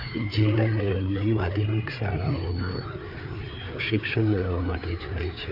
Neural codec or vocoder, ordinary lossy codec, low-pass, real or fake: codec, 16 kHz, 4 kbps, FreqCodec, larger model; none; 5.4 kHz; fake